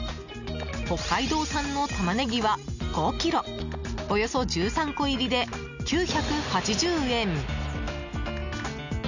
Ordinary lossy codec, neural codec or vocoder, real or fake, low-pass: none; none; real; 7.2 kHz